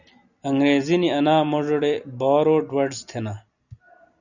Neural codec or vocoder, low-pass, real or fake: none; 7.2 kHz; real